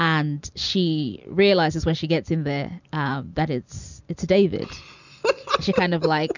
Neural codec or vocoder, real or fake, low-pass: none; real; 7.2 kHz